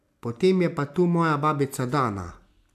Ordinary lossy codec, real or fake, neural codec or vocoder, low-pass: MP3, 96 kbps; real; none; 14.4 kHz